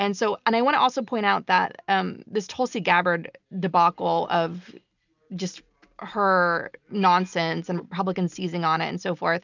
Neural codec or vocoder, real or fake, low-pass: none; real; 7.2 kHz